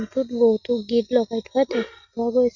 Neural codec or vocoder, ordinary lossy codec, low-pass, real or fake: none; none; 7.2 kHz; real